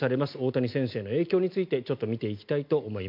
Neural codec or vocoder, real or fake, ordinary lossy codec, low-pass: none; real; MP3, 48 kbps; 5.4 kHz